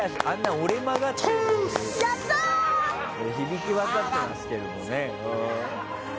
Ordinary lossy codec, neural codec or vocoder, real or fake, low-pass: none; none; real; none